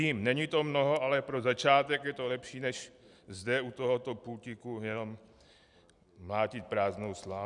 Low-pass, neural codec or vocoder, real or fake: 10.8 kHz; none; real